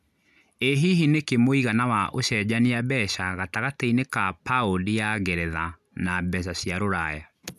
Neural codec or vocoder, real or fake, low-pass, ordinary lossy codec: none; real; 14.4 kHz; none